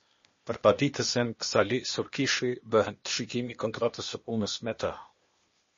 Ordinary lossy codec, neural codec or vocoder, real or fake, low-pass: MP3, 32 kbps; codec, 16 kHz, 0.8 kbps, ZipCodec; fake; 7.2 kHz